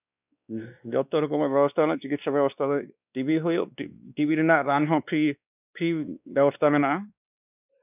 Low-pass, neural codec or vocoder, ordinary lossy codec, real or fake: 3.6 kHz; codec, 16 kHz, 2 kbps, X-Codec, WavLM features, trained on Multilingual LibriSpeech; none; fake